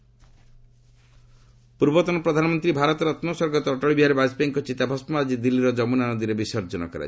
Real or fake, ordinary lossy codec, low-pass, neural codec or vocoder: real; none; none; none